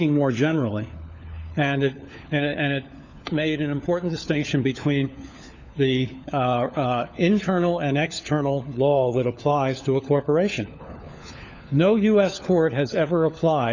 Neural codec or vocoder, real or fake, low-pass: codec, 16 kHz, 4 kbps, FunCodec, trained on LibriTTS, 50 frames a second; fake; 7.2 kHz